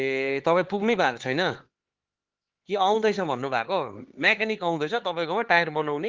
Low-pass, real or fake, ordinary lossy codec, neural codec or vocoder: 7.2 kHz; fake; Opus, 16 kbps; codec, 16 kHz, 4 kbps, X-Codec, HuBERT features, trained on LibriSpeech